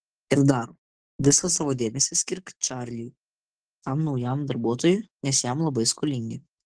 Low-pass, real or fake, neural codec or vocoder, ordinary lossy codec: 9.9 kHz; fake; codec, 44.1 kHz, 7.8 kbps, DAC; Opus, 16 kbps